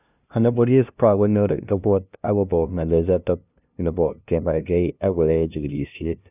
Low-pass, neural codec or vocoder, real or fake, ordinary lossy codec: 3.6 kHz; codec, 16 kHz, 0.5 kbps, FunCodec, trained on LibriTTS, 25 frames a second; fake; none